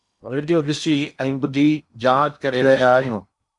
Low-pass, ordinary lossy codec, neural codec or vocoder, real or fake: 10.8 kHz; MP3, 96 kbps; codec, 16 kHz in and 24 kHz out, 0.8 kbps, FocalCodec, streaming, 65536 codes; fake